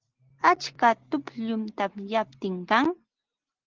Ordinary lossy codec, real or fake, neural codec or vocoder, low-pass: Opus, 16 kbps; real; none; 7.2 kHz